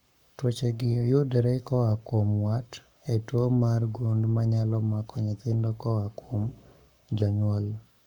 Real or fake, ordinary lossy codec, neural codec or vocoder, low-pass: fake; none; codec, 44.1 kHz, 7.8 kbps, Pupu-Codec; 19.8 kHz